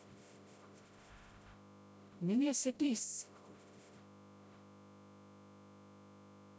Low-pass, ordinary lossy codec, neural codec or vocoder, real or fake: none; none; codec, 16 kHz, 0.5 kbps, FreqCodec, smaller model; fake